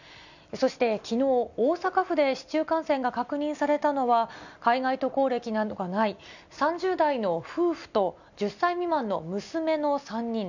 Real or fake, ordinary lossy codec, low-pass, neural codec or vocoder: real; none; 7.2 kHz; none